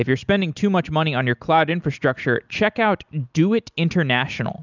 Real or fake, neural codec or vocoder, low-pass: real; none; 7.2 kHz